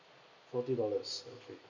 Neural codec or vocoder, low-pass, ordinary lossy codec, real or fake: none; 7.2 kHz; none; real